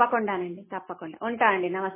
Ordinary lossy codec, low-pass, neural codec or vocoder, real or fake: MP3, 16 kbps; 3.6 kHz; none; real